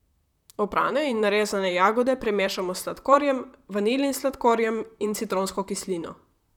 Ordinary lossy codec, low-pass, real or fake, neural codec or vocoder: none; 19.8 kHz; fake; vocoder, 44.1 kHz, 128 mel bands, Pupu-Vocoder